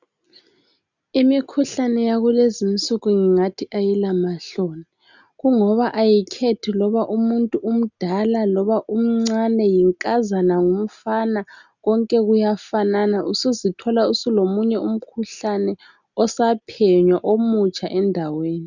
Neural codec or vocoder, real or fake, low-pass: none; real; 7.2 kHz